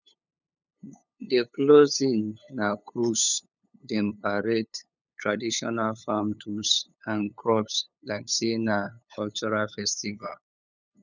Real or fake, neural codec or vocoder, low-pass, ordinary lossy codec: fake; codec, 16 kHz, 8 kbps, FunCodec, trained on LibriTTS, 25 frames a second; 7.2 kHz; none